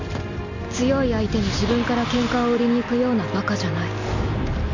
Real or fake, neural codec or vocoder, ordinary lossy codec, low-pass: real; none; none; 7.2 kHz